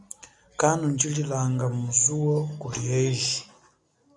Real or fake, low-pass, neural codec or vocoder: real; 10.8 kHz; none